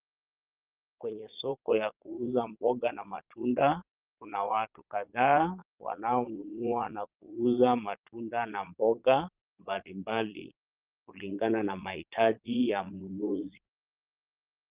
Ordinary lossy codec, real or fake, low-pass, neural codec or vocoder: Opus, 32 kbps; fake; 3.6 kHz; vocoder, 22.05 kHz, 80 mel bands, Vocos